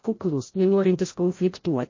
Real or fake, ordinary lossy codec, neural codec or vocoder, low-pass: fake; MP3, 32 kbps; codec, 16 kHz, 0.5 kbps, FreqCodec, larger model; 7.2 kHz